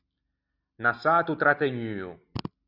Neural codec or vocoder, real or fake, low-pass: none; real; 5.4 kHz